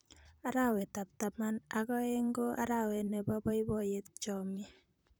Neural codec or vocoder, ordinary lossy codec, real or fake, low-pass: vocoder, 44.1 kHz, 128 mel bands, Pupu-Vocoder; none; fake; none